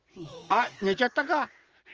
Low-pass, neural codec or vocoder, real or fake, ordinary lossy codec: 7.2 kHz; vocoder, 44.1 kHz, 80 mel bands, Vocos; fake; Opus, 24 kbps